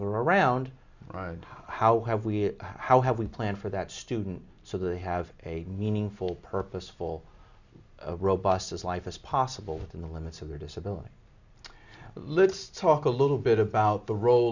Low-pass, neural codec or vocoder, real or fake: 7.2 kHz; none; real